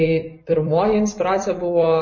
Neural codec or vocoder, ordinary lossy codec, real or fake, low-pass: vocoder, 44.1 kHz, 128 mel bands every 256 samples, BigVGAN v2; MP3, 32 kbps; fake; 7.2 kHz